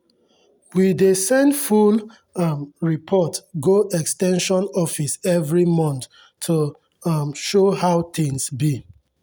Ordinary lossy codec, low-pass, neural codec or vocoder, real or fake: none; none; none; real